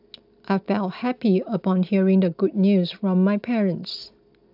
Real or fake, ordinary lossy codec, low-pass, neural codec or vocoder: real; AAC, 48 kbps; 5.4 kHz; none